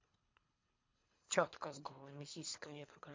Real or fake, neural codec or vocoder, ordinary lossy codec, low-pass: fake; codec, 24 kHz, 3 kbps, HILCodec; MP3, 48 kbps; 7.2 kHz